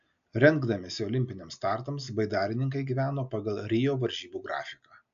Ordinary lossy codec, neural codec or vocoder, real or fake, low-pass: AAC, 96 kbps; none; real; 7.2 kHz